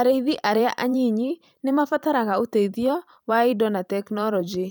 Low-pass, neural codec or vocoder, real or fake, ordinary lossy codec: none; vocoder, 44.1 kHz, 128 mel bands every 512 samples, BigVGAN v2; fake; none